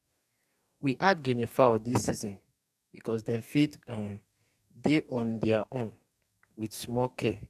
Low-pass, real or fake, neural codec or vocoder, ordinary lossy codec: 14.4 kHz; fake; codec, 44.1 kHz, 2.6 kbps, DAC; none